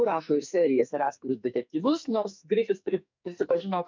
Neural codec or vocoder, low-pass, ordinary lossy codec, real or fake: codec, 44.1 kHz, 2.6 kbps, SNAC; 7.2 kHz; MP3, 64 kbps; fake